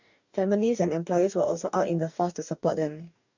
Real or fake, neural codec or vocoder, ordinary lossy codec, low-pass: fake; codec, 44.1 kHz, 2.6 kbps, DAC; none; 7.2 kHz